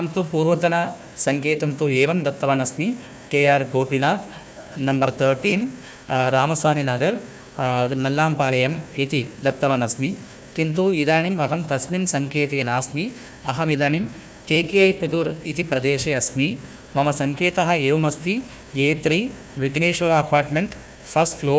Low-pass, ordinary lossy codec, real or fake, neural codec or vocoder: none; none; fake; codec, 16 kHz, 1 kbps, FunCodec, trained on Chinese and English, 50 frames a second